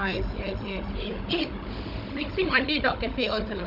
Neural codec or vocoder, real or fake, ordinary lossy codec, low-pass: codec, 16 kHz, 16 kbps, FunCodec, trained on Chinese and English, 50 frames a second; fake; none; 5.4 kHz